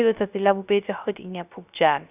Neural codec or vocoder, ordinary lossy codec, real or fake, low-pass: codec, 16 kHz, 0.3 kbps, FocalCodec; none; fake; 3.6 kHz